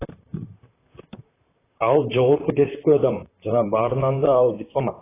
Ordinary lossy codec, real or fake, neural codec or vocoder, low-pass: AAC, 16 kbps; fake; vocoder, 44.1 kHz, 128 mel bands, Pupu-Vocoder; 3.6 kHz